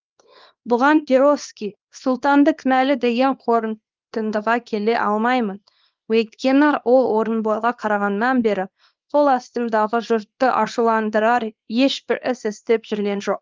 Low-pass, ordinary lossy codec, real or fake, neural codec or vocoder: 7.2 kHz; Opus, 32 kbps; fake; codec, 24 kHz, 0.9 kbps, WavTokenizer, small release